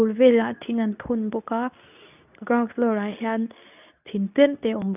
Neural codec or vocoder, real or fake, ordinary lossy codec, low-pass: codec, 24 kHz, 0.9 kbps, WavTokenizer, medium speech release version 2; fake; none; 3.6 kHz